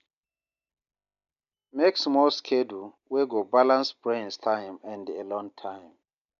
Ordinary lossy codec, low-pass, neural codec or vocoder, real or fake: none; 7.2 kHz; none; real